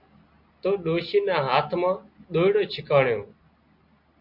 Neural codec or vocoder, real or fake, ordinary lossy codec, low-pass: none; real; MP3, 48 kbps; 5.4 kHz